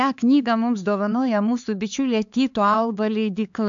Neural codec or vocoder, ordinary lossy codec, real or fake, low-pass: codec, 16 kHz, 4 kbps, X-Codec, HuBERT features, trained on LibriSpeech; AAC, 64 kbps; fake; 7.2 kHz